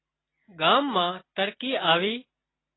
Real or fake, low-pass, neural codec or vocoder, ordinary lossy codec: real; 7.2 kHz; none; AAC, 16 kbps